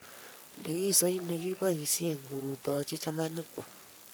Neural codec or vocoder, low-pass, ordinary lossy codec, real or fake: codec, 44.1 kHz, 3.4 kbps, Pupu-Codec; none; none; fake